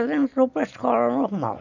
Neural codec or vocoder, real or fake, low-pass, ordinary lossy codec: none; real; 7.2 kHz; none